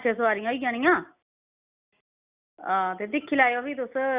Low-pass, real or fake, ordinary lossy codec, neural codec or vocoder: 3.6 kHz; real; Opus, 64 kbps; none